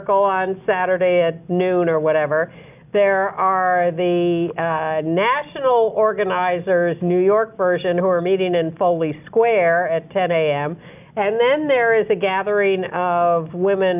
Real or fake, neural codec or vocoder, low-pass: real; none; 3.6 kHz